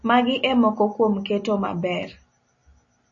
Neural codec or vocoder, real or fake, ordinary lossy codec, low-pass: none; real; MP3, 32 kbps; 7.2 kHz